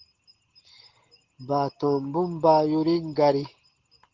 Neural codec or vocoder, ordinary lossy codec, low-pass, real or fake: none; Opus, 16 kbps; 7.2 kHz; real